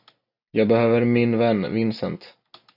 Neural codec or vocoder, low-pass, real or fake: none; 5.4 kHz; real